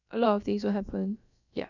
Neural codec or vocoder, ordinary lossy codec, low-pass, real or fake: codec, 16 kHz, about 1 kbps, DyCAST, with the encoder's durations; none; 7.2 kHz; fake